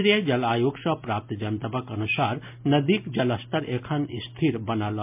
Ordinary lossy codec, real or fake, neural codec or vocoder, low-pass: MP3, 32 kbps; real; none; 3.6 kHz